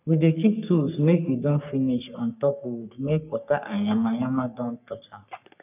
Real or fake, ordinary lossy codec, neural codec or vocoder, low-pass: fake; none; codec, 44.1 kHz, 3.4 kbps, Pupu-Codec; 3.6 kHz